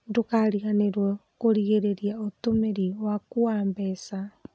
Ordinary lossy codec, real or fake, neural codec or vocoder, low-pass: none; real; none; none